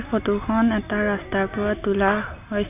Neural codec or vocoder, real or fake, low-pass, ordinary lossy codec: none; real; 3.6 kHz; none